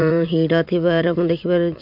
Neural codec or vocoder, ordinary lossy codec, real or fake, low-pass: vocoder, 44.1 kHz, 80 mel bands, Vocos; none; fake; 5.4 kHz